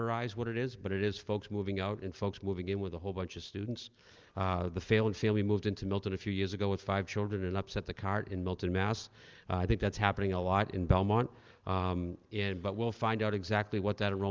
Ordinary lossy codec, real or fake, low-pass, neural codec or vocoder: Opus, 24 kbps; real; 7.2 kHz; none